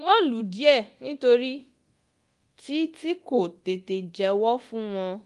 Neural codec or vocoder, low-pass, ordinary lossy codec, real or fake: codec, 24 kHz, 0.9 kbps, DualCodec; 10.8 kHz; Opus, 24 kbps; fake